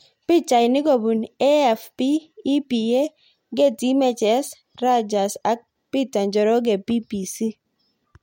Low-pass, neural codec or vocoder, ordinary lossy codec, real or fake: 19.8 kHz; none; MP3, 64 kbps; real